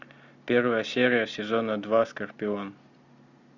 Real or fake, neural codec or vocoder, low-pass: real; none; 7.2 kHz